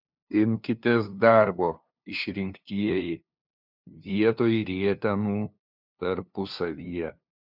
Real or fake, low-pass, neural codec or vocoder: fake; 5.4 kHz; codec, 16 kHz, 2 kbps, FunCodec, trained on LibriTTS, 25 frames a second